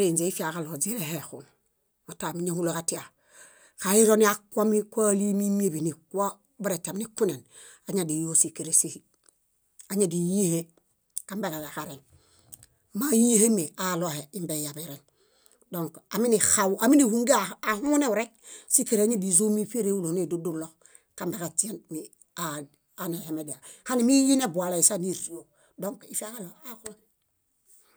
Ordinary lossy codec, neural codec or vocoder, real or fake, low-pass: none; none; real; none